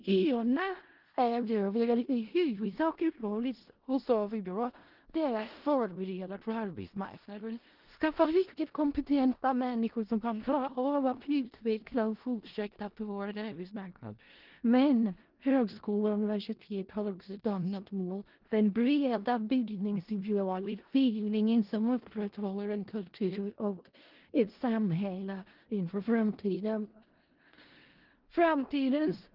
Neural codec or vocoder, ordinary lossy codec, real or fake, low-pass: codec, 16 kHz in and 24 kHz out, 0.4 kbps, LongCat-Audio-Codec, four codebook decoder; Opus, 16 kbps; fake; 5.4 kHz